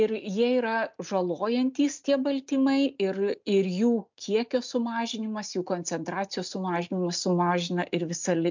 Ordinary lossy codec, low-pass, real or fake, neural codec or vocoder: MP3, 64 kbps; 7.2 kHz; real; none